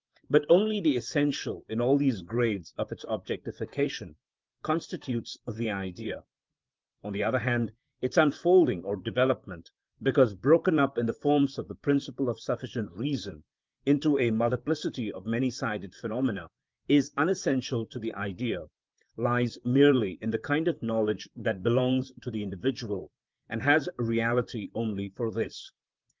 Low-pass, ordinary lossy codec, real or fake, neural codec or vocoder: 7.2 kHz; Opus, 32 kbps; fake; vocoder, 44.1 kHz, 128 mel bands, Pupu-Vocoder